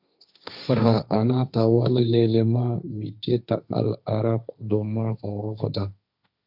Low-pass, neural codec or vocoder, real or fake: 5.4 kHz; codec, 16 kHz, 1.1 kbps, Voila-Tokenizer; fake